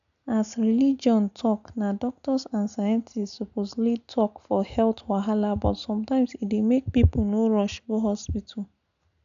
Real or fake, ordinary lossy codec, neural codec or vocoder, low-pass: real; none; none; 7.2 kHz